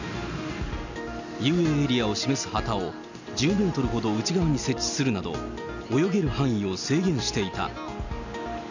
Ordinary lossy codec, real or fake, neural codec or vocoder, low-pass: none; real; none; 7.2 kHz